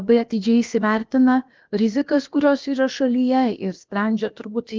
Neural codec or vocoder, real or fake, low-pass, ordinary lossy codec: codec, 16 kHz, about 1 kbps, DyCAST, with the encoder's durations; fake; 7.2 kHz; Opus, 32 kbps